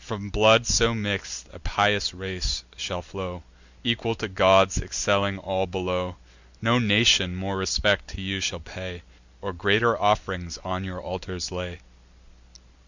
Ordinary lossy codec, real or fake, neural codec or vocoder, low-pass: Opus, 64 kbps; real; none; 7.2 kHz